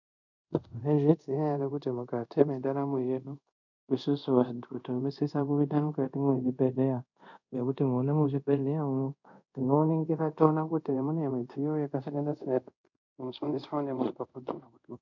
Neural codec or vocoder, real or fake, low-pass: codec, 24 kHz, 0.5 kbps, DualCodec; fake; 7.2 kHz